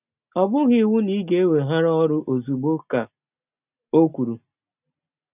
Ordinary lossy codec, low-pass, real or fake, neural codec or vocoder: none; 3.6 kHz; real; none